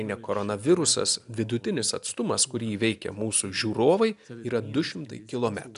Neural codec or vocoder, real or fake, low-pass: none; real; 10.8 kHz